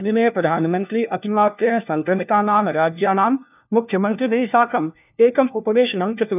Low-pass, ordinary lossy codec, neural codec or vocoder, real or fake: 3.6 kHz; none; codec, 16 kHz, 1 kbps, FunCodec, trained on LibriTTS, 50 frames a second; fake